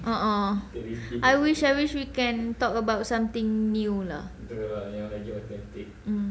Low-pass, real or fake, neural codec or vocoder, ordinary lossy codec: none; real; none; none